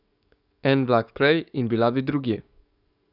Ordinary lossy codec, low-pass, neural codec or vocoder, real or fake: none; 5.4 kHz; codec, 44.1 kHz, 7.8 kbps, DAC; fake